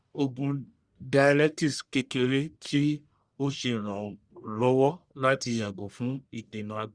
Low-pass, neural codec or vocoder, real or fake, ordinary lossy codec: 9.9 kHz; codec, 44.1 kHz, 1.7 kbps, Pupu-Codec; fake; Opus, 64 kbps